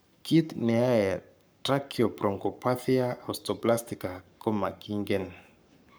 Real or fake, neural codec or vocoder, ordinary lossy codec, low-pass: fake; codec, 44.1 kHz, 7.8 kbps, Pupu-Codec; none; none